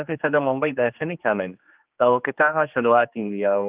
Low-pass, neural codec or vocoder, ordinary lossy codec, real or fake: 3.6 kHz; codec, 16 kHz, 2 kbps, X-Codec, HuBERT features, trained on general audio; Opus, 24 kbps; fake